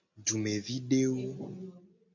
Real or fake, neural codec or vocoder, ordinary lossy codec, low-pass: real; none; MP3, 48 kbps; 7.2 kHz